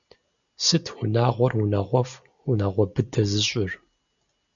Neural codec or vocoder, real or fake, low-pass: none; real; 7.2 kHz